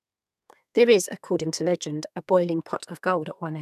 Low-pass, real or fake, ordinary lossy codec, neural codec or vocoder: 14.4 kHz; fake; none; codec, 32 kHz, 1.9 kbps, SNAC